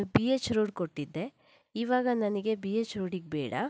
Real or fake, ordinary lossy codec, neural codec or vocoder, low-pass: real; none; none; none